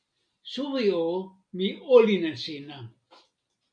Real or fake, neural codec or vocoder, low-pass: real; none; 9.9 kHz